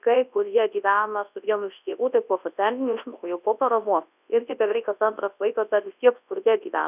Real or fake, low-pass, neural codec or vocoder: fake; 3.6 kHz; codec, 24 kHz, 0.9 kbps, WavTokenizer, large speech release